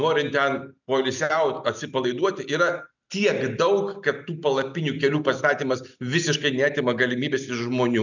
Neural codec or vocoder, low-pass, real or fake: none; 7.2 kHz; real